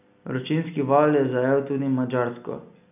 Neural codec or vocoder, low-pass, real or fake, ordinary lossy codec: none; 3.6 kHz; real; none